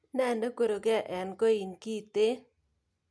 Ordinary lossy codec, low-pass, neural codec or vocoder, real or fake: none; none; none; real